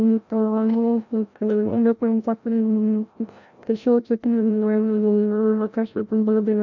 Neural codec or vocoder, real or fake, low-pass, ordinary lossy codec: codec, 16 kHz, 0.5 kbps, FreqCodec, larger model; fake; 7.2 kHz; none